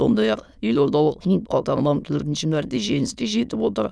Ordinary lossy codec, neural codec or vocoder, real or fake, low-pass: none; autoencoder, 22.05 kHz, a latent of 192 numbers a frame, VITS, trained on many speakers; fake; none